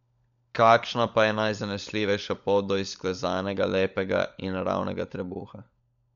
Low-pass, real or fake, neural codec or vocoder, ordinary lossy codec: 7.2 kHz; fake; codec, 16 kHz, 16 kbps, FunCodec, trained on LibriTTS, 50 frames a second; none